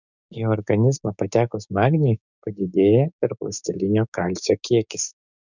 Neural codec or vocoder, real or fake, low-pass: codec, 16 kHz, 6 kbps, DAC; fake; 7.2 kHz